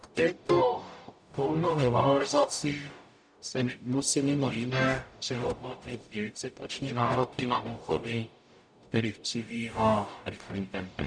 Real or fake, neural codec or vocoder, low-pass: fake; codec, 44.1 kHz, 0.9 kbps, DAC; 9.9 kHz